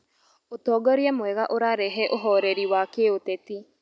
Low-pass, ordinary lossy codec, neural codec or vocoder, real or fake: none; none; none; real